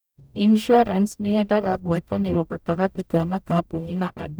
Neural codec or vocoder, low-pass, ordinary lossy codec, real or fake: codec, 44.1 kHz, 0.9 kbps, DAC; none; none; fake